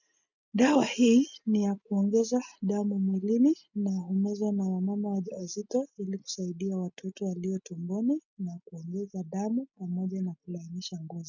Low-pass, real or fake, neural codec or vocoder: 7.2 kHz; real; none